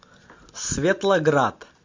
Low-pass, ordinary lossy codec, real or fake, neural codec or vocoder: 7.2 kHz; MP3, 48 kbps; real; none